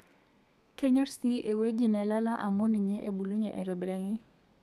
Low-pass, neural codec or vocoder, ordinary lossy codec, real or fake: 14.4 kHz; codec, 32 kHz, 1.9 kbps, SNAC; none; fake